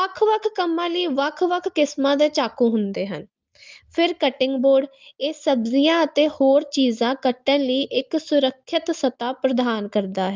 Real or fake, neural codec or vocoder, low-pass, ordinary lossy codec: real; none; 7.2 kHz; Opus, 24 kbps